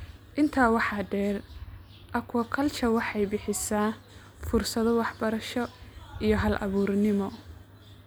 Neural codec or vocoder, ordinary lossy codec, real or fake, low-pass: none; none; real; none